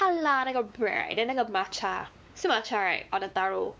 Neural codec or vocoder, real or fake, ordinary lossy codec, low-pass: codec, 16 kHz, 4 kbps, X-Codec, WavLM features, trained on Multilingual LibriSpeech; fake; none; none